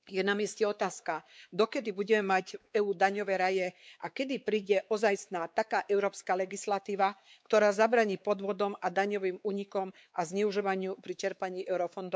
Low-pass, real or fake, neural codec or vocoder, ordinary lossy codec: none; fake; codec, 16 kHz, 4 kbps, X-Codec, WavLM features, trained on Multilingual LibriSpeech; none